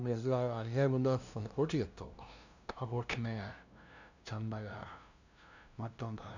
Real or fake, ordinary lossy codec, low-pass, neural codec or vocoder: fake; none; 7.2 kHz; codec, 16 kHz, 0.5 kbps, FunCodec, trained on LibriTTS, 25 frames a second